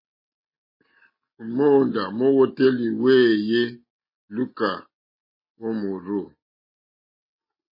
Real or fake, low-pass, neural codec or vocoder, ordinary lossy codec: real; 5.4 kHz; none; MP3, 24 kbps